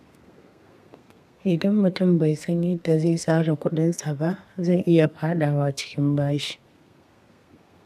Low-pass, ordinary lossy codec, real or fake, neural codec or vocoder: 14.4 kHz; none; fake; codec, 32 kHz, 1.9 kbps, SNAC